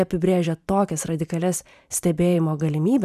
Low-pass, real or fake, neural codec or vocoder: 14.4 kHz; fake; vocoder, 44.1 kHz, 128 mel bands every 512 samples, BigVGAN v2